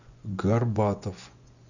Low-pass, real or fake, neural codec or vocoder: 7.2 kHz; fake; vocoder, 24 kHz, 100 mel bands, Vocos